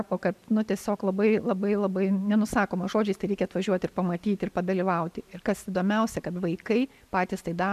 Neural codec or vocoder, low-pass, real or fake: none; 14.4 kHz; real